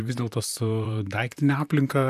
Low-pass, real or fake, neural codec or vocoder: 14.4 kHz; fake; vocoder, 44.1 kHz, 128 mel bands, Pupu-Vocoder